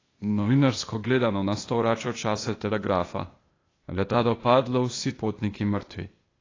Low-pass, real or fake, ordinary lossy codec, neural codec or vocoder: 7.2 kHz; fake; AAC, 32 kbps; codec, 16 kHz, 0.8 kbps, ZipCodec